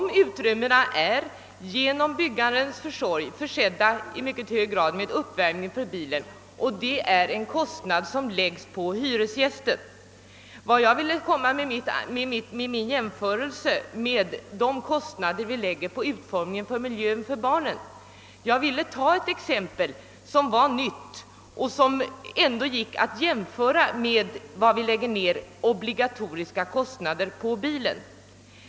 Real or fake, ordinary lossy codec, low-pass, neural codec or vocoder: real; none; none; none